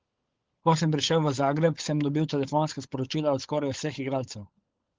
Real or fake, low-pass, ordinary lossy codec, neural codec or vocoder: fake; 7.2 kHz; Opus, 16 kbps; codec, 16 kHz, 16 kbps, FunCodec, trained on LibriTTS, 50 frames a second